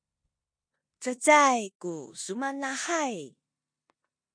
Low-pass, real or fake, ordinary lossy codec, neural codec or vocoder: 9.9 kHz; fake; MP3, 48 kbps; codec, 16 kHz in and 24 kHz out, 0.9 kbps, LongCat-Audio-Codec, four codebook decoder